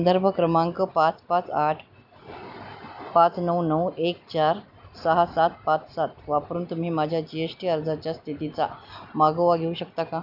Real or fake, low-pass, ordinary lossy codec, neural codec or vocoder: real; 5.4 kHz; none; none